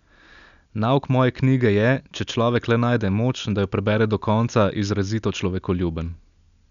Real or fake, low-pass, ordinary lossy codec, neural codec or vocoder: real; 7.2 kHz; none; none